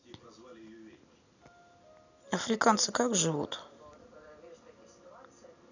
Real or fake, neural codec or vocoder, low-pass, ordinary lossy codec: real; none; 7.2 kHz; none